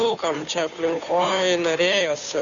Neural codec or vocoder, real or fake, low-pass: codec, 16 kHz, 2 kbps, FunCodec, trained on Chinese and English, 25 frames a second; fake; 7.2 kHz